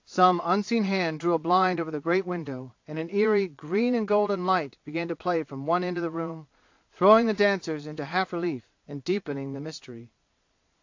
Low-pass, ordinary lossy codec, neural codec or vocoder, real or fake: 7.2 kHz; AAC, 48 kbps; vocoder, 22.05 kHz, 80 mel bands, Vocos; fake